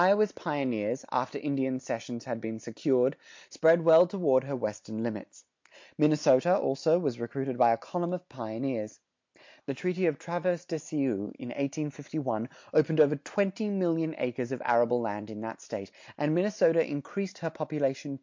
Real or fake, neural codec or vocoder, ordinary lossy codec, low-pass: real; none; MP3, 48 kbps; 7.2 kHz